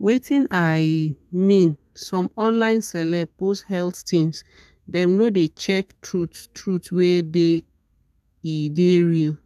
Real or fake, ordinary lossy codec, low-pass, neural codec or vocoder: fake; none; 14.4 kHz; codec, 32 kHz, 1.9 kbps, SNAC